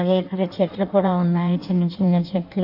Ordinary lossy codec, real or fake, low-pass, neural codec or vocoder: none; fake; 5.4 kHz; codec, 16 kHz in and 24 kHz out, 1.1 kbps, FireRedTTS-2 codec